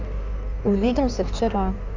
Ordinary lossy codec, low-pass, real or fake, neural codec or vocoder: none; 7.2 kHz; fake; codec, 16 kHz in and 24 kHz out, 1.1 kbps, FireRedTTS-2 codec